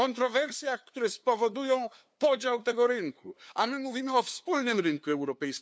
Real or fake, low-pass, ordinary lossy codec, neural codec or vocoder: fake; none; none; codec, 16 kHz, 2 kbps, FunCodec, trained on LibriTTS, 25 frames a second